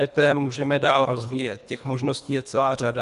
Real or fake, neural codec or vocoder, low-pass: fake; codec, 24 kHz, 1.5 kbps, HILCodec; 10.8 kHz